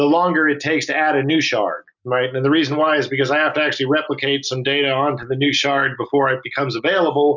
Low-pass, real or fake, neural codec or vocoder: 7.2 kHz; real; none